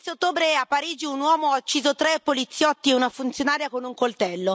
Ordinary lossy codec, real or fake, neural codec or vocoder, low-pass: none; real; none; none